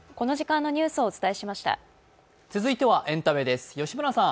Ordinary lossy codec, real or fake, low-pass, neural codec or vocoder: none; real; none; none